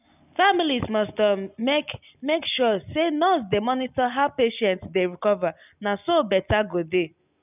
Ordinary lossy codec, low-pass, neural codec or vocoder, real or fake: none; 3.6 kHz; none; real